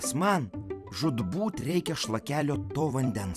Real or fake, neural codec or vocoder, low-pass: fake; vocoder, 44.1 kHz, 128 mel bands every 256 samples, BigVGAN v2; 14.4 kHz